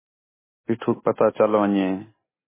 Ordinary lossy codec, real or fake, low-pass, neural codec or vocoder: MP3, 16 kbps; real; 3.6 kHz; none